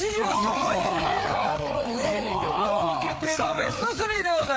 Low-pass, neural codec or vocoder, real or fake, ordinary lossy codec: none; codec, 16 kHz, 4 kbps, FreqCodec, larger model; fake; none